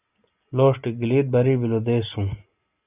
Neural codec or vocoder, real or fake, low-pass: none; real; 3.6 kHz